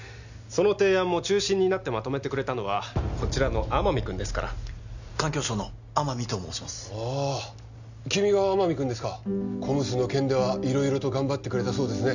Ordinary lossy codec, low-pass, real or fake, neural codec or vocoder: none; 7.2 kHz; real; none